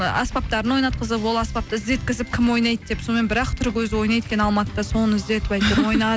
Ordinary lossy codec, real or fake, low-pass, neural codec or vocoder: none; real; none; none